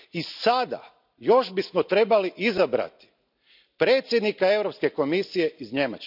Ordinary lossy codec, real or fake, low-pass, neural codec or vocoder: none; real; 5.4 kHz; none